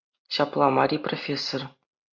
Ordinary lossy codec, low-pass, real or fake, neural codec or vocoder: MP3, 64 kbps; 7.2 kHz; real; none